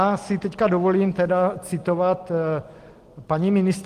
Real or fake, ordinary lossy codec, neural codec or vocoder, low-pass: real; Opus, 24 kbps; none; 14.4 kHz